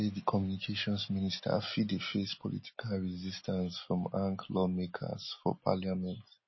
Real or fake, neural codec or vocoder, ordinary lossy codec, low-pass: real; none; MP3, 24 kbps; 7.2 kHz